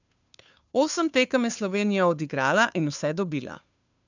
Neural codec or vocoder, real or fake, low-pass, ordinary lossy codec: codec, 16 kHz, 2 kbps, FunCodec, trained on Chinese and English, 25 frames a second; fake; 7.2 kHz; none